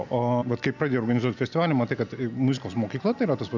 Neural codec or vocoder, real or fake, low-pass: none; real; 7.2 kHz